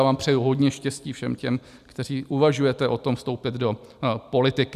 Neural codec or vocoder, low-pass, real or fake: none; 14.4 kHz; real